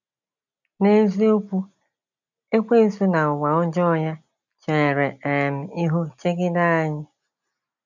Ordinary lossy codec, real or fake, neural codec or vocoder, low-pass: none; real; none; 7.2 kHz